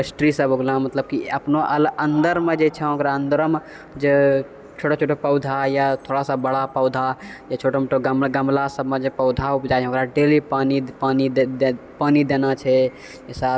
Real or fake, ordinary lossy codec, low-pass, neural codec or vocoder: real; none; none; none